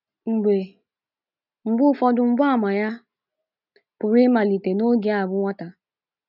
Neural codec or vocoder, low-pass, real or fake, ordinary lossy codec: none; 5.4 kHz; real; none